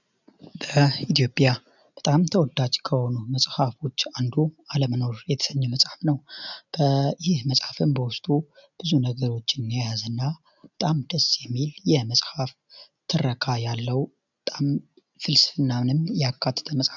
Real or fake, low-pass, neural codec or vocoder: real; 7.2 kHz; none